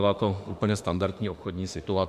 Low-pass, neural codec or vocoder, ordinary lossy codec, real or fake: 14.4 kHz; autoencoder, 48 kHz, 32 numbers a frame, DAC-VAE, trained on Japanese speech; MP3, 96 kbps; fake